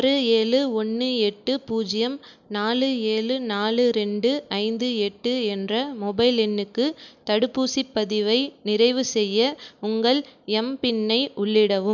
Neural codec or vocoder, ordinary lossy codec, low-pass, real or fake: none; none; 7.2 kHz; real